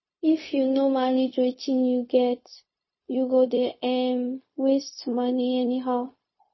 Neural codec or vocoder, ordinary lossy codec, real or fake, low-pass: codec, 16 kHz, 0.4 kbps, LongCat-Audio-Codec; MP3, 24 kbps; fake; 7.2 kHz